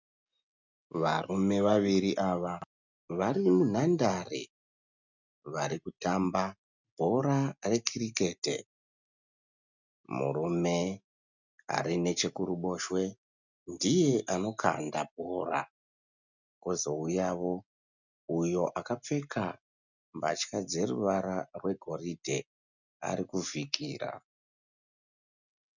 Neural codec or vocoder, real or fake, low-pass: none; real; 7.2 kHz